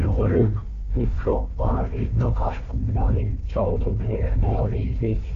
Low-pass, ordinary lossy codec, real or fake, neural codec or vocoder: 7.2 kHz; none; fake; codec, 16 kHz, 1 kbps, FunCodec, trained on Chinese and English, 50 frames a second